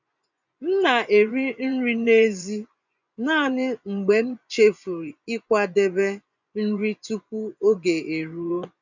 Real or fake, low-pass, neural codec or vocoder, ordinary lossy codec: fake; 7.2 kHz; vocoder, 24 kHz, 100 mel bands, Vocos; none